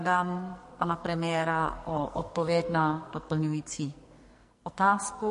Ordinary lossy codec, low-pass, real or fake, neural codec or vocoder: MP3, 48 kbps; 14.4 kHz; fake; codec, 44.1 kHz, 2.6 kbps, SNAC